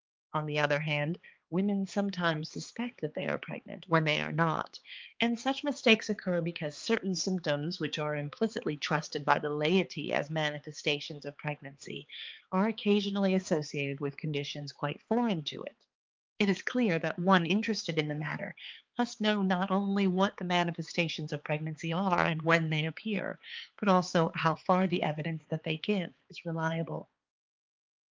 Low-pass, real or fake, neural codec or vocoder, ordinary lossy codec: 7.2 kHz; fake; codec, 16 kHz, 4 kbps, X-Codec, HuBERT features, trained on balanced general audio; Opus, 24 kbps